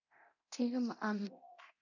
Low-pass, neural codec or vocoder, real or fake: 7.2 kHz; codec, 24 kHz, 0.9 kbps, DualCodec; fake